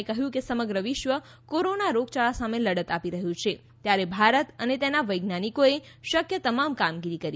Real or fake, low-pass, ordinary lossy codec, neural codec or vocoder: real; none; none; none